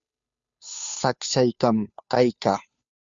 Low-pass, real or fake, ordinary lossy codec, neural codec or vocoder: 7.2 kHz; fake; Opus, 64 kbps; codec, 16 kHz, 2 kbps, FunCodec, trained on Chinese and English, 25 frames a second